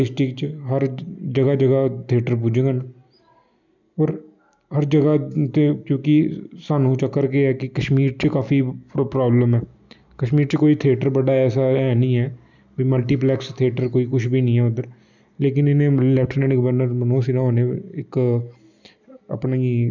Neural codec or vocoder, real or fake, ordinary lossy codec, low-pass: none; real; none; 7.2 kHz